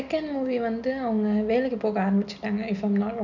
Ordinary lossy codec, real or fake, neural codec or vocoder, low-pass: none; real; none; 7.2 kHz